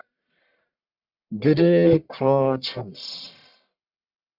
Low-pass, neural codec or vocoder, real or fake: 5.4 kHz; codec, 44.1 kHz, 1.7 kbps, Pupu-Codec; fake